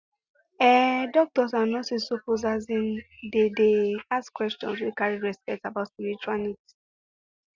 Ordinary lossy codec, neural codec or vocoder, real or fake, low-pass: Opus, 64 kbps; none; real; 7.2 kHz